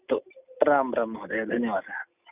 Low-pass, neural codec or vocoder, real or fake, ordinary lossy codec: 3.6 kHz; none; real; none